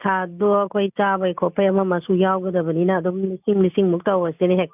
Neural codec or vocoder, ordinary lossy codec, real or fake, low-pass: none; none; real; 3.6 kHz